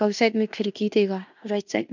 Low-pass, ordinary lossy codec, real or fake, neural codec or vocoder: 7.2 kHz; none; fake; codec, 16 kHz in and 24 kHz out, 0.9 kbps, LongCat-Audio-Codec, fine tuned four codebook decoder